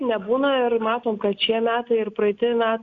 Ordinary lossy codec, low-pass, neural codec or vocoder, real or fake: Opus, 64 kbps; 7.2 kHz; none; real